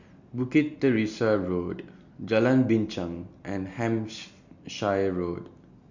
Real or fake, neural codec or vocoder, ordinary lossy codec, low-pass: real; none; Opus, 64 kbps; 7.2 kHz